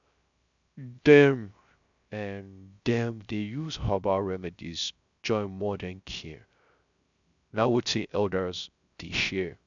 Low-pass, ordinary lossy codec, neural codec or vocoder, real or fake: 7.2 kHz; none; codec, 16 kHz, 0.3 kbps, FocalCodec; fake